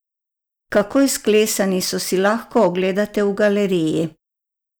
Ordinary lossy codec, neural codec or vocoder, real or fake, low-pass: none; none; real; none